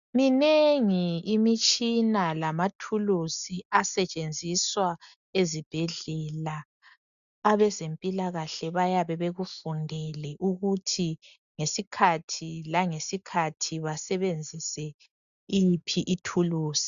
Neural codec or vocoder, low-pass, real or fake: none; 7.2 kHz; real